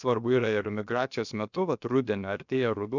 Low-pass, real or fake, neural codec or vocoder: 7.2 kHz; fake; codec, 16 kHz, about 1 kbps, DyCAST, with the encoder's durations